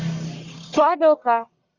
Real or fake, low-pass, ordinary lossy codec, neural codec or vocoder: fake; 7.2 kHz; Opus, 64 kbps; codec, 44.1 kHz, 1.7 kbps, Pupu-Codec